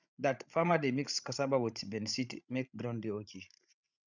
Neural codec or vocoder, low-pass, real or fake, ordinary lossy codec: codec, 16 kHz, 16 kbps, FreqCodec, larger model; 7.2 kHz; fake; none